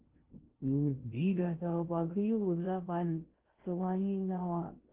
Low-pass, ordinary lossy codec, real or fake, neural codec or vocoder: 3.6 kHz; Opus, 16 kbps; fake; codec, 16 kHz in and 24 kHz out, 0.6 kbps, FocalCodec, streaming, 2048 codes